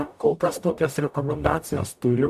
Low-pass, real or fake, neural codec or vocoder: 14.4 kHz; fake; codec, 44.1 kHz, 0.9 kbps, DAC